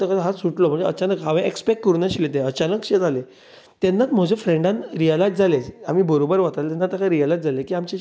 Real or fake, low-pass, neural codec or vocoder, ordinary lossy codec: real; none; none; none